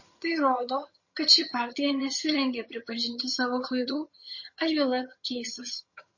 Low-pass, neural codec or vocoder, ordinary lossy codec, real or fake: 7.2 kHz; vocoder, 22.05 kHz, 80 mel bands, HiFi-GAN; MP3, 32 kbps; fake